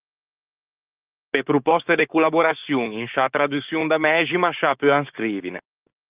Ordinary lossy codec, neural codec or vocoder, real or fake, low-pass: Opus, 24 kbps; codec, 16 kHz, 6 kbps, DAC; fake; 3.6 kHz